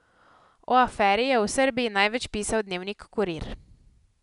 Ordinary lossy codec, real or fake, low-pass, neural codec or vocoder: none; real; 10.8 kHz; none